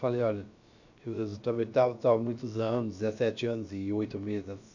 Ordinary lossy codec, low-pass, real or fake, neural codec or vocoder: none; 7.2 kHz; fake; codec, 16 kHz, about 1 kbps, DyCAST, with the encoder's durations